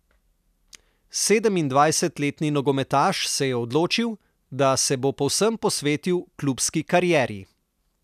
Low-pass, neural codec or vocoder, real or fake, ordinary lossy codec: 14.4 kHz; none; real; none